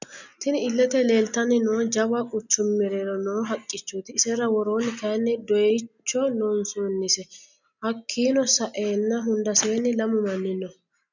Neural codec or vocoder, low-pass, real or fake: none; 7.2 kHz; real